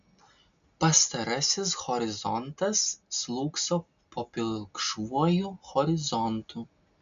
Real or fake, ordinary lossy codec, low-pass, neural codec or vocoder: real; MP3, 64 kbps; 7.2 kHz; none